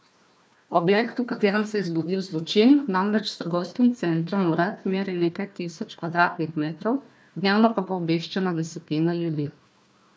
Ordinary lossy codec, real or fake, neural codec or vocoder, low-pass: none; fake; codec, 16 kHz, 1 kbps, FunCodec, trained on Chinese and English, 50 frames a second; none